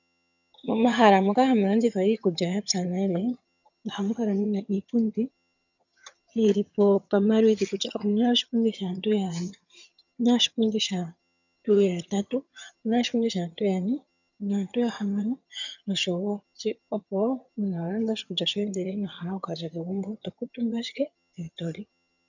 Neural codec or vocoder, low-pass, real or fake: vocoder, 22.05 kHz, 80 mel bands, HiFi-GAN; 7.2 kHz; fake